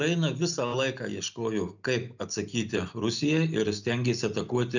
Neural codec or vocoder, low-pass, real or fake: none; 7.2 kHz; real